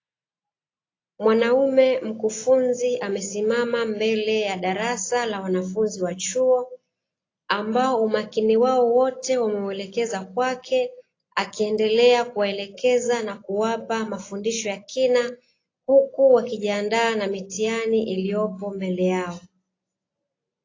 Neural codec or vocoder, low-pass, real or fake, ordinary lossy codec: none; 7.2 kHz; real; AAC, 32 kbps